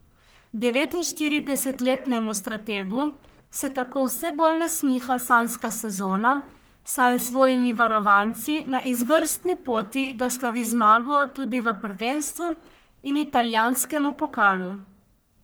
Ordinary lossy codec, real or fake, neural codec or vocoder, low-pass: none; fake; codec, 44.1 kHz, 1.7 kbps, Pupu-Codec; none